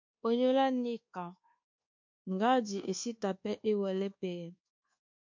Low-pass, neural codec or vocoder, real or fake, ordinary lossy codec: 7.2 kHz; codec, 24 kHz, 1.2 kbps, DualCodec; fake; MP3, 48 kbps